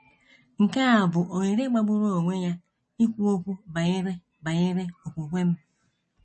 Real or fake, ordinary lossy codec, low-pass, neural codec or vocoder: real; MP3, 32 kbps; 10.8 kHz; none